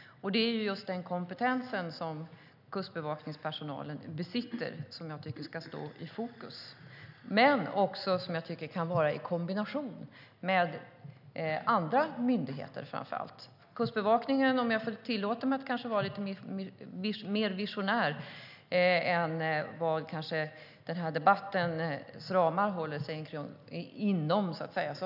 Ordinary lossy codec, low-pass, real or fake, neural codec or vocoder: none; 5.4 kHz; real; none